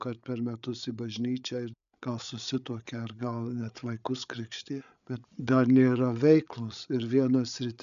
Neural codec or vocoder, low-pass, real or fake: codec, 16 kHz, 8 kbps, FreqCodec, larger model; 7.2 kHz; fake